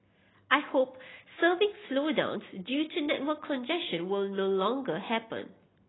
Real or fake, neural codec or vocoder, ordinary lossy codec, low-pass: real; none; AAC, 16 kbps; 7.2 kHz